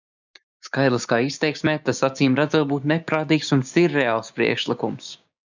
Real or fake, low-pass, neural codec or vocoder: fake; 7.2 kHz; codec, 16 kHz, 6 kbps, DAC